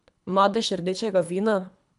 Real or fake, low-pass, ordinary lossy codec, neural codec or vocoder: fake; 10.8 kHz; none; codec, 24 kHz, 3 kbps, HILCodec